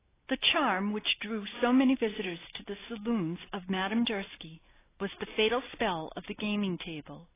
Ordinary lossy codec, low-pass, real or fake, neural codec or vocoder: AAC, 16 kbps; 3.6 kHz; real; none